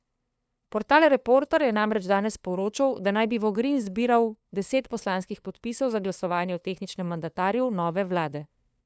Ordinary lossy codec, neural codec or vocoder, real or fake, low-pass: none; codec, 16 kHz, 2 kbps, FunCodec, trained on LibriTTS, 25 frames a second; fake; none